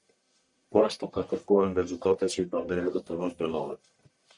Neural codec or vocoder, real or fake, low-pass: codec, 44.1 kHz, 1.7 kbps, Pupu-Codec; fake; 10.8 kHz